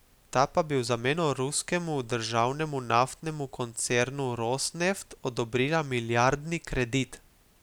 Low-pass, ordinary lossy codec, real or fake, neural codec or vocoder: none; none; real; none